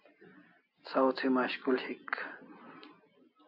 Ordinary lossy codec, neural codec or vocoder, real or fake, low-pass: AAC, 48 kbps; none; real; 5.4 kHz